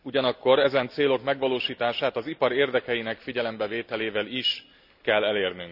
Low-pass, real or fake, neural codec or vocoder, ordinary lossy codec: 5.4 kHz; real; none; none